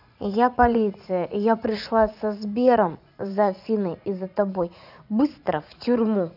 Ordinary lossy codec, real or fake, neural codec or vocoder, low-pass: none; fake; vocoder, 44.1 kHz, 80 mel bands, Vocos; 5.4 kHz